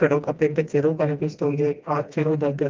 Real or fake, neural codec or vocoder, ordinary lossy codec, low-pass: fake; codec, 16 kHz, 1 kbps, FreqCodec, smaller model; Opus, 24 kbps; 7.2 kHz